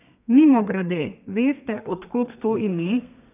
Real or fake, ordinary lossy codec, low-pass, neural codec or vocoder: fake; none; 3.6 kHz; codec, 32 kHz, 1.9 kbps, SNAC